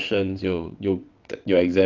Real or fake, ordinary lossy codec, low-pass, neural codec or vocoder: fake; Opus, 32 kbps; 7.2 kHz; vocoder, 22.05 kHz, 80 mel bands, WaveNeXt